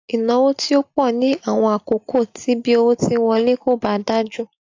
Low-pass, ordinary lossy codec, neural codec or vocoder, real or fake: 7.2 kHz; AAC, 48 kbps; none; real